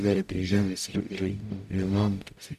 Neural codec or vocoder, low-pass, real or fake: codec, 44.1 kHz, 0.9 kbps, DAC; 14.4 kHz; fake